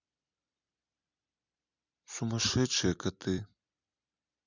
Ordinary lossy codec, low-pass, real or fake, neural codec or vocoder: none; 7.2 kHz; fake; vocoder, 44.1 kHz, 128 mel bands every 256 samples, BigVGAN v2